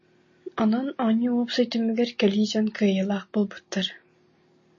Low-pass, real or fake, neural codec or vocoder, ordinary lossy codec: 7.2 kHz; real; none; MP3, 32 kbps